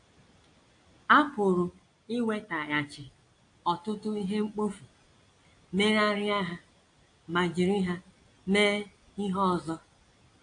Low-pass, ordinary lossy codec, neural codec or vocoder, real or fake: 9.9 kHz; AAC, 48 kbps; none; real